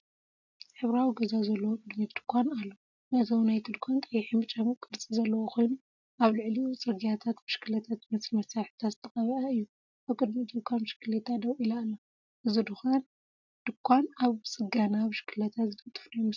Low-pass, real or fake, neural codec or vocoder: 7.2 kHz; real; none